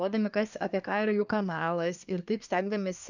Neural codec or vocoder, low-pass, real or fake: codec, 24 kHz, 1 kbps, SNAC; 7.2 kHz; fake